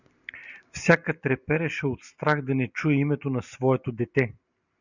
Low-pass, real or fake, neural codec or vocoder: 7.2 kHz; real; none